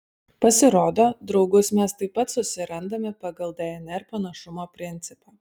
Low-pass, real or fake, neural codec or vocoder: 19.8 kHz; real; none